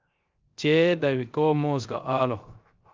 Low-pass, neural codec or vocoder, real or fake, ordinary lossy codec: 7.2 kHz; codec, 16 kHz, 0.3 kbps, FocalCodec; fake; Opus, 24 kbps